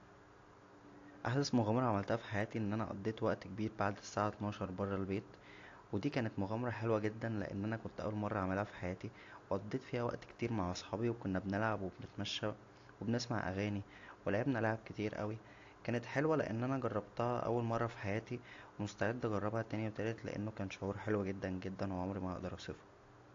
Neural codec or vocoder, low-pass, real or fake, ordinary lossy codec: none; 7.2 kHz; real; AAC, 48 kbps